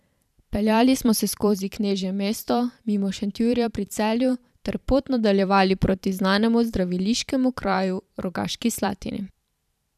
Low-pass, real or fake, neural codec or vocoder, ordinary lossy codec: 14.4 kHz; fake; vocoder, 44.1 kHz, 128 mel bands every 512 samples, BigVGAN v2; none